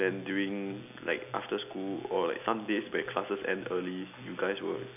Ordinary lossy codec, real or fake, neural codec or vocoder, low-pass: none; real; none; 3.6 kHz